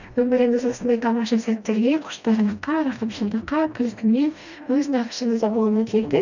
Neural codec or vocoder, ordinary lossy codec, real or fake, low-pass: codec, 16 kHz, 1 kbps, FreqCodec, smaller model; none; fake; 7.2 kHz